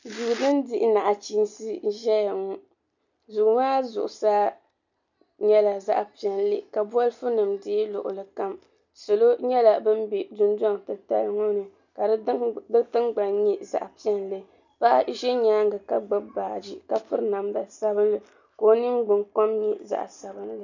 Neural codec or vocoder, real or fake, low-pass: none; real; 7.2 kHz